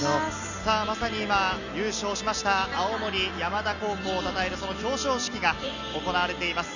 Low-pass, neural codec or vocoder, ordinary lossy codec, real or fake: 7.2 kHz; none; none; real